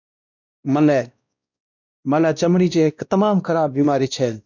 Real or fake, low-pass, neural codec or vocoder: fake; 7.2 kHz; codec, 16 kHz, 1 kbps, X-Codec, WavLM features, trained on Multilingual LibriSpeech